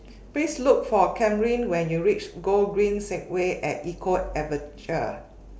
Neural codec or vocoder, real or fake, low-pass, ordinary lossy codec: none; real; none; none